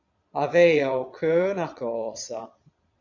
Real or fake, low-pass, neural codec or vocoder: fake; 7.2 kHz; vocoder, 22.05 kHz, 80 mel bands, Vocos